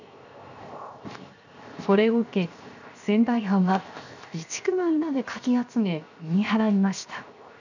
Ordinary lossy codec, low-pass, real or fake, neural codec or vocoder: none; 7.2 kHz; fake; codec, 16 kHz, 0.7 kbps, FocalCodec